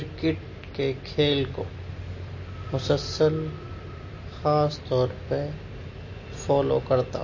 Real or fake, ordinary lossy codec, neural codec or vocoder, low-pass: real; MP3, 32 kbps; none; 7.2 kHz